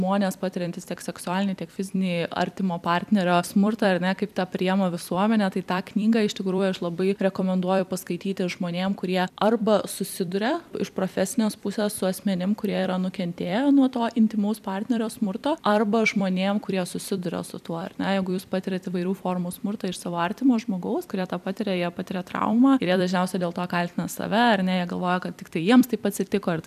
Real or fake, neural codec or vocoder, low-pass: fake; vocoder, 44.1 kHz, 128 mel bands every 256 samples, BigVGAN v2; 14.4 kHz